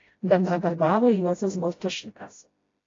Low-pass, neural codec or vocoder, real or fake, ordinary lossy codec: 7.2 kHz; codec, 16 kHz, 0.5 kbps, FreqCodec, smaller model; fake; AAC, 32 kbps